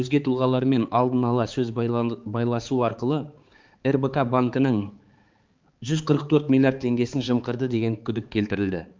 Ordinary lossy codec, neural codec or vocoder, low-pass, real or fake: Opus, 24 kbps; codec, 16 kHz, 4 kbps, X-Codec, HuBERT features, trained on balanced general audio; 7.2 kHz; fake